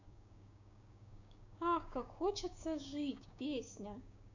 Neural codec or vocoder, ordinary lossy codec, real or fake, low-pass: codec, 16 kHz, 6 kbps, DAC; none; fake; 7.2 kHz